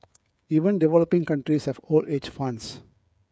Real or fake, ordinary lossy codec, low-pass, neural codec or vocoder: fake; none; none; codec, 16 kHz, 16 kbps, FreqCodec, smaller model